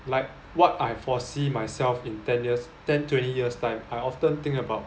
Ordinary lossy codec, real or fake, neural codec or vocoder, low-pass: none; real; none; none